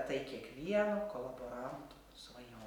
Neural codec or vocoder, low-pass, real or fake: none; 19.8 kHz; real